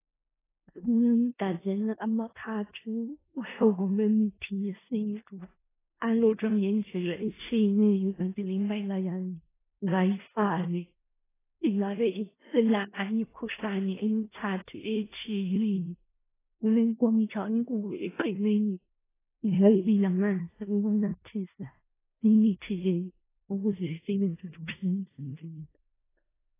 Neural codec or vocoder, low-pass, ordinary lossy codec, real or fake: codec, 16 kHz in and 24 kHz out, 0.4 kbps, LongCat-Audio-Codec, four codebook decoder; 3.6 kHz; AAC, 16 kbps; fake